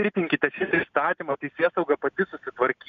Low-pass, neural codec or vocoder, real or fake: 7.2 kHz; none; real